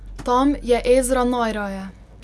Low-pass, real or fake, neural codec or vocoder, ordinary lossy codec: none; real; none; none